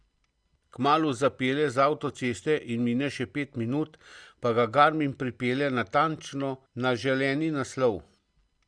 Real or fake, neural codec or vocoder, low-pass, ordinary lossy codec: real; none; 9.9 kHz; Opus, 64 kbps